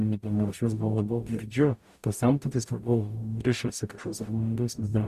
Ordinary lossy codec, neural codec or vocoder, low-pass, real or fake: Opus, 64 kbps; codec, 44.1 kHz, 0.9 kbps, DAC; 14.4 kHz; fake